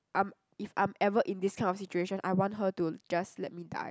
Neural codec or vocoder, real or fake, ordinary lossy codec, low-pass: none; real; none; none